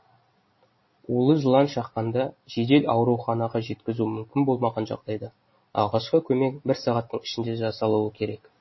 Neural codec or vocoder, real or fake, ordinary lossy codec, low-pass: none; real; MP3, 24 kbps; 7.2 kHz